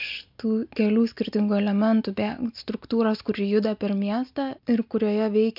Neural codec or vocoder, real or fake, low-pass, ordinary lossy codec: none; real; 5.4 kHz; MP3, 48 kbps